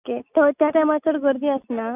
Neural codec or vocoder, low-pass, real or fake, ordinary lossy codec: none; 3.6 kHz; real; none